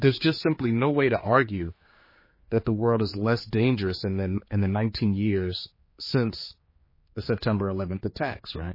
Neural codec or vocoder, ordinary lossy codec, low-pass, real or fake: codec, 16 kHz, 4 kbps, X-Codec, HuBERT features, trained on general audio; MP3, 24 kbps; 5.4 kHz; fake